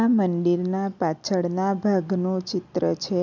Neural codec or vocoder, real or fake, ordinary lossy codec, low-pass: none; real; none; 7.2 kHz